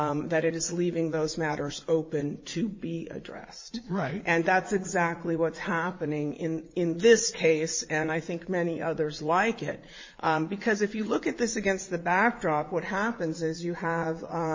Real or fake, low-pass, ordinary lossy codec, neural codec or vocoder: fake; 7.2 kHz; MP3, 32 kbps; vocoder, 44.1 kHz, 80 mel bands, Vocos